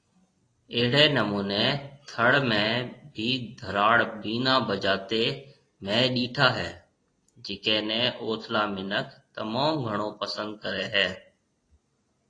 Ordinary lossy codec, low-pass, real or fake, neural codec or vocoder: AAC, 32 kbps; 9.9 kHz; real; none